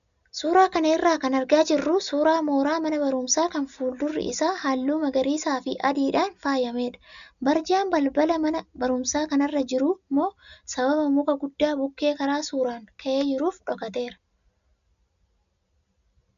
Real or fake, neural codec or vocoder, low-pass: real; none; 7.2 kHz